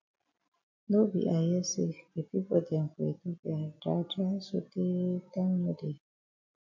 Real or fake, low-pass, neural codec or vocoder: real; 7.2 kHz; none